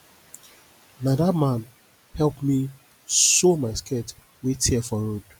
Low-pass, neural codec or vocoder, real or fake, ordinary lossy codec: none; none; real; none